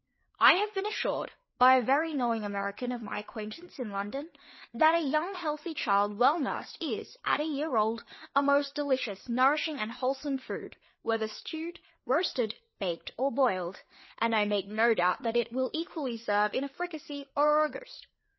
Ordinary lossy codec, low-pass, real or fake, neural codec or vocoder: MP3, 24 kbps; 7.2 kHz; fake; codec, 16 kHz, 4 kbps, FreqCodec, larger model